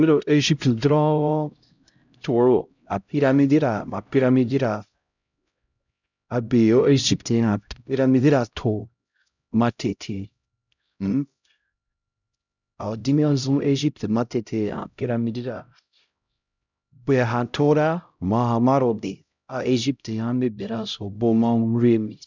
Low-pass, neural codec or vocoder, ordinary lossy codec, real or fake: 7.2 kHz; codec, 16 kHz, 0.5 kbps, X-Codec, HuBERT features, trained on LibriSpeech; none; fake